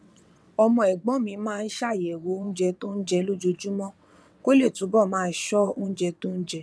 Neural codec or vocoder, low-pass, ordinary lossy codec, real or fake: vocoder, 22.05 kHz, 80 mel bands, WaveNeXt; none; none; fake